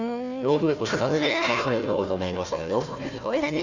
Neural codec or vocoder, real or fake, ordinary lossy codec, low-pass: codec, 16 kHz, 1 kbps, FunCodec, trained on Chinese and English, 50 frames a second; fake; none; 7.2 kHz